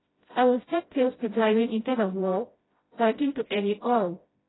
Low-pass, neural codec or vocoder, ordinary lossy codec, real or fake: 7.2 kHz; codec, 16 kHz, 0.5 kbps, FreqCodec, smaller model; AAC, 16 kbps; fake